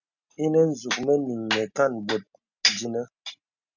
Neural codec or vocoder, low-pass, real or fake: none; 7.2 kHz; real